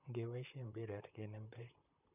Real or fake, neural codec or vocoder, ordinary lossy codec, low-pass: fake; vocoder, 44.1 kHz, 128 mel bands, Pupu-Vocoder; none; 3.6 kHz